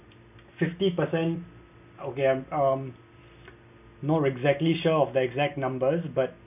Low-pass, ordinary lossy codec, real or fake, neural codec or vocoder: 3.6 kHz; none; real; none